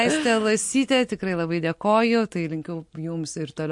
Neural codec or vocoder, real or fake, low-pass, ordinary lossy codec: none; real; 10.8 kHz; MP3, 48 kbps